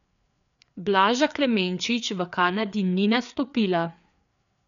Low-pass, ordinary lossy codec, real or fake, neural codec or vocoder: 7.2 kHz; none; fake; codec, 16 kHz, 4 kbps, FreqCodec, larger model